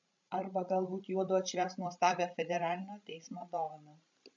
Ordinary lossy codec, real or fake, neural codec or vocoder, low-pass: AAC, 64 kbps; fake; codec, 16 kHz, 16 kbps, FreqCodec, larger model; 7.2 kHz